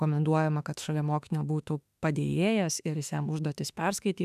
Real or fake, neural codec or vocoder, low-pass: fake; autoencoder, 48 kHz, 32 numbers a frame, DAC-VAE, trained on Japanese speech; 14.4 kHz